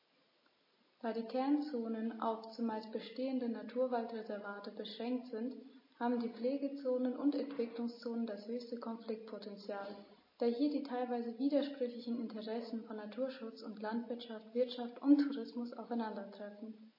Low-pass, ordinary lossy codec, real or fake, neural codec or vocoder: 5.4 kHz; MP3, 24 kbps; real; none